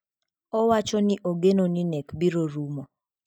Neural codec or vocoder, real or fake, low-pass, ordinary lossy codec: none; real; 19.8 kHz; none